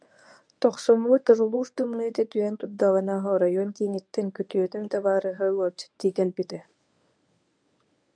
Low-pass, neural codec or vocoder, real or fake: 9.9 kHz; codec, 24 kHz, 0.9 kbps, WavTokenizer, medium speech release version 1; fake